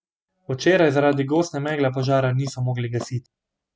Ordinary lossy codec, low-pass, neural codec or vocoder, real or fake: none; none; none; real